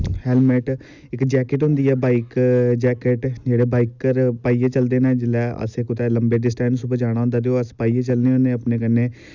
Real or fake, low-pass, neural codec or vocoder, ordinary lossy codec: real; 7.2 kHz; none; none